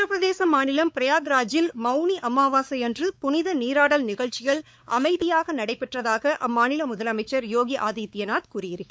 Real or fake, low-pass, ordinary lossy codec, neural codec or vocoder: fake; none; none; codec, 16 kHz, 4 kbps, X-Codec, WavLM features, trained on Multilingual LibriSpeech